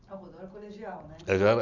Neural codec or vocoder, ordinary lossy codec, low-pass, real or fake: none; none; 7.2 kHz; real